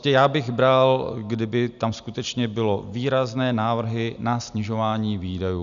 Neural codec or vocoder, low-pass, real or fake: none; 7.2 kHz; real